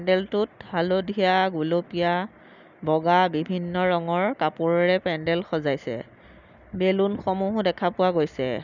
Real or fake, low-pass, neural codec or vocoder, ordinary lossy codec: real; 7.2 kHz; none; none